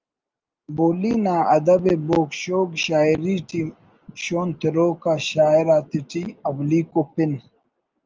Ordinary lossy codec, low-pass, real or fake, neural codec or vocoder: Opus, 24 kbps; 7.2 kHz; real; none